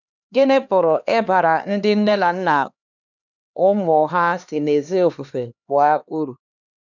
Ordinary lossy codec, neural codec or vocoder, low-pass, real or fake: none; codec, 16 kHz, 2 kbps, X-Codec, HuBERT features, trained on LibriSpeech; 7.2 kHz; fake